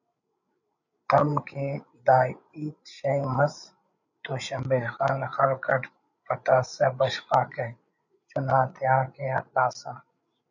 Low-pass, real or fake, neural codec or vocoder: 7.2 kHz; fake; codec, 16 kHz, 8 kbps, FreqCodec, larger model